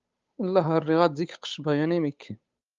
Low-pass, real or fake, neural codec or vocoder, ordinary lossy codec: 7.2 kHz; fake; codec, 16 kHz, 8 kbps, FunCodec, trained on Chinese and English, 25 frames a second; Opus, 32 kbps